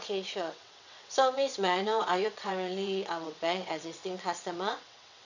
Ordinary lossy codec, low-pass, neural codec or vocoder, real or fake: none; 7.2 kHz; vocoder, 22.05 kHz, 80 mel bands, WaveNeXt; fake